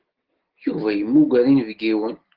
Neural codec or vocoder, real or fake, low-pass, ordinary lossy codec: none; real; 5.4 kHz; Opus, 16 kbps